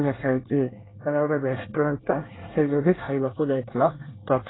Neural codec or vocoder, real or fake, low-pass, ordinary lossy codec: codec, 24 kHz, 1 kbps, SNAC; fake; 7.2 kHz; AAC, 16 kbps